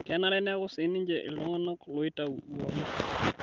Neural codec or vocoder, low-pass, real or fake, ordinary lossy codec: none; 7.2 kHz; real; Opus, 32 kbps